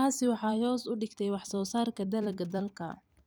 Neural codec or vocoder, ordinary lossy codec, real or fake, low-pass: vocoder, 44.1 kHz, 128 mel bands every 256 samples, BigVGAN v2; none; fake; none